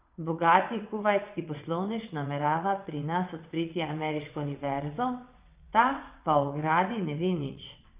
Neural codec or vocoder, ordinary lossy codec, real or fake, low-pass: vocoder, 22.05 kHz, 80 mel bands, WaveNeXt; Opus, 64 kbps; fake; 3.6 kHz